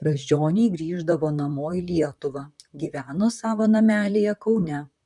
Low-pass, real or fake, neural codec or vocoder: 10.8 kHz; fake; vocoder, 44.1 kHz, 128 mel bands, Pupu-Vocoder